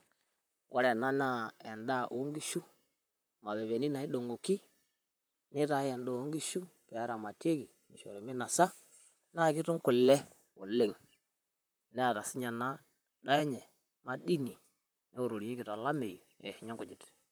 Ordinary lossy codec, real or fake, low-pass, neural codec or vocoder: none; fake; none; vocoder, 44.1 kHz, 128 mel bands, Pupu-Vocoder